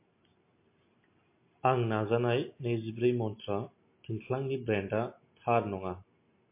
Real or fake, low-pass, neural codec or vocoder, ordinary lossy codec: real; 3.6 kHz; none; MP3, 24 kbps